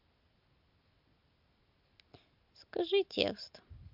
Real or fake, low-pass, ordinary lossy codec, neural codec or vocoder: real; 5.4 kHz; MP3, 48 kbps; none